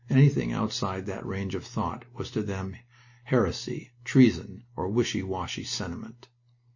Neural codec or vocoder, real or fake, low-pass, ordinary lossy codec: none; real; 7.2 kHz; MP3, 32 kbps